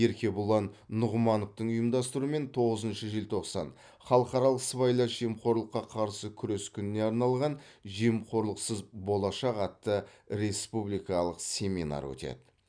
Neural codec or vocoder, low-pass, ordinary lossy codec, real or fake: none; 9.9 kHz; none; real